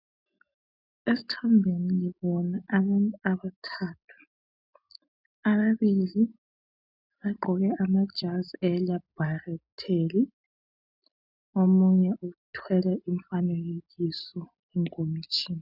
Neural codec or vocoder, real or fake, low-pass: none; real; 5.4 kHz